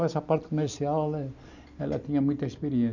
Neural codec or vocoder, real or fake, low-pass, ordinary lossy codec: none; real; 7.2 kHz; none